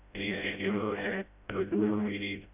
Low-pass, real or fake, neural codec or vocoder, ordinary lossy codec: 3.6 kHz; fake; codec, 16 kHz, 0.5 kbps, FreqCodec, smaller model; none